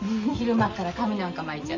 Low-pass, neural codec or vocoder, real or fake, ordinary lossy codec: 7.2 kHz; none; real; MP3, 32 kbps